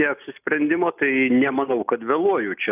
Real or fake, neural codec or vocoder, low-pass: real; none; 3.6 kHz